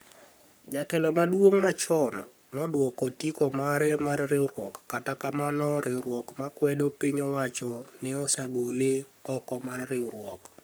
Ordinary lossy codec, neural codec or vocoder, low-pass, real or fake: none; codec, 44.1 kHz, 3.4 kbps, Pupu-Codec; none; fake